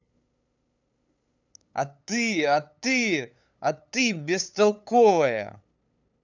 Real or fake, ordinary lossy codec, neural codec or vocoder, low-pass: fake; none; codec, 16 kHz, 8 kbps, FunCodec, trained on LibriTTS, 25 frames a second; 7.2 kHz